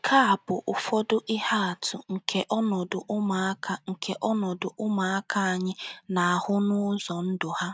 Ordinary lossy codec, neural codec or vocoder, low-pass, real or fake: none; none; none; real